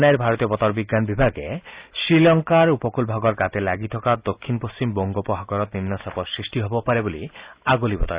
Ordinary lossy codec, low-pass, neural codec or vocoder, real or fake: Opus, 64 kbps; 3.6 kHz; none; real